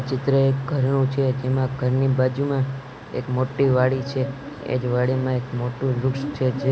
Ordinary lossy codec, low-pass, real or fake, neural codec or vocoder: none; none; real; none